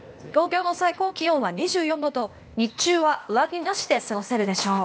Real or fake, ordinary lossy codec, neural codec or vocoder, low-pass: fake; none; codec, 16 kHz, 0.8 kbps, ZipCodec; none